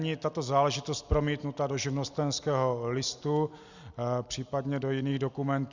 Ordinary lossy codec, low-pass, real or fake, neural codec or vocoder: Opus, 64 kbps; 7.2 kHz; real; none